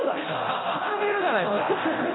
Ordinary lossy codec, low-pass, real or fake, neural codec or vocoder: AAC, 16 kbps; 7.2 kHz; fake; codec, 24 kHz, 0.9 kbps, DualCodec